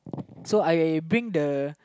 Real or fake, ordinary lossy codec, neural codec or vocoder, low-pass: real; none; none; none